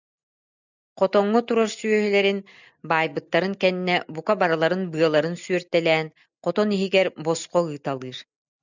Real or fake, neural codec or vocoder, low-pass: real; none; 7.2 kHz